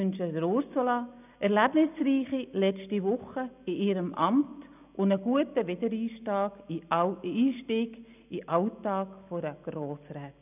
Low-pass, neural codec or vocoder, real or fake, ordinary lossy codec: 3.6 kHz; none; real; none